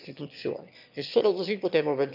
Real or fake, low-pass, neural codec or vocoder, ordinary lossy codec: fake; 5.4 kHz; autoencoder, 22.05 kHz, a latent of 192 numbers a frame, VITS, trained on one speaker; none